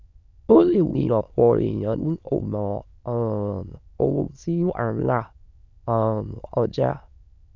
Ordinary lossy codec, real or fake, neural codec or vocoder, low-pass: none; fake; autoencoder, 22.05 kHz, a latent of 192 numbers a frame, VITS, trained on many speakers; 7.2 kHz